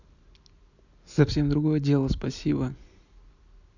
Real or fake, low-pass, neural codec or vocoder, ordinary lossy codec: real; 7.2 kHz; none; none